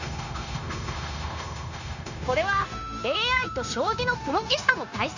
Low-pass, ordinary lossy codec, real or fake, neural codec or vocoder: 7.2 kHz; none; fake; codec, 16 kHz, 0.9 kbps, LongCat-Audio-Codec